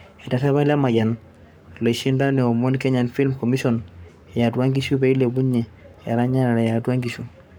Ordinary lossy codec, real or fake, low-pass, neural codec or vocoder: none; fake; none; codec, 44.1 kHz, 7.8 kbps, Pupu-Codec